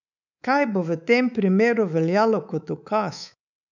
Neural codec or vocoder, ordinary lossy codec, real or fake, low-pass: codec, 24 kHz, 3.1 kbps, DualCodec; none; fake; 7.2 kHz